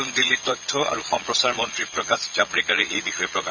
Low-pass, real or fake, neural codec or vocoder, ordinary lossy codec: 7.2 kHz; fake; vocoder, 22.05 kHz, 80 mel bands, Vocos; none